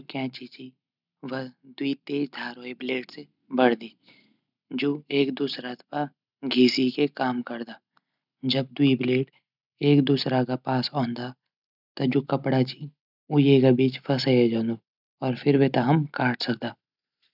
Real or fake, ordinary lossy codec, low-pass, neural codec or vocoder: real; none; 5.4 kHz; none